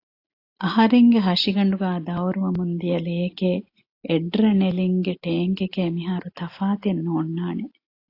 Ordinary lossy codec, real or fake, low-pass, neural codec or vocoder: AAC, 32 kbps; real; 5.4 kHz; none